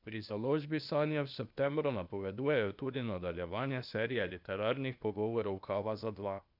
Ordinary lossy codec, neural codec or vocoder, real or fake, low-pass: none; codec, 16 kHz, 0.8 kbps, ZipCodec; fake; 5.4 kHz